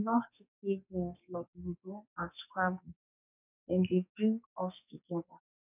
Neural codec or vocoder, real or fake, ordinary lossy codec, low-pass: codec, 44.1 kHz, 2.6 kbps, SNAC; fake; none; 3.6 kHz